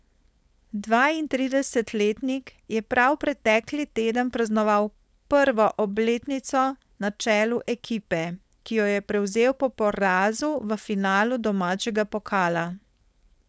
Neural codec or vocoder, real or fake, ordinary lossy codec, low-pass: codec, 16 kHz, 4.8 kbps, FACodec; fake; none; none